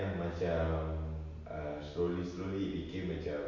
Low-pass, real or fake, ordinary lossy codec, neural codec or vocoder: 7.2 kHz; real; MP3, 48 kbps; none